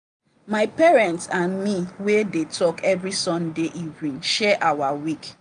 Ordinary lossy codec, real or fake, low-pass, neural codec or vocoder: none; real; 9.9 kHz; none